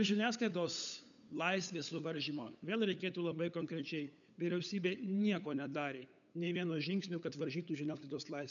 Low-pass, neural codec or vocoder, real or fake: 7.2 kHz; codec, 16 kHz, 8 kbps, FunCodec, trained on LibriTTS, 25 frames a second; fake